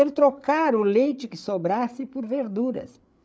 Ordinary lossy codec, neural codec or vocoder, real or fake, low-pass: none; codec, 16 kHz, 8 kbps, FreqCodec, larger model; fake; none